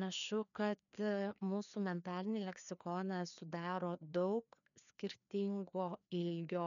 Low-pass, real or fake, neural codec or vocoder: 7.2 kHz; fake; codec, 16 kHz, 2 kbps, FreqCodec, larger model